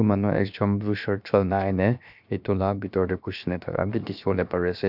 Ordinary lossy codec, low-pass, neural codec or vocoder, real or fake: none; 5.4 kHz; codec, 16 kHz, 0.7 kbps, FocalCodec; fake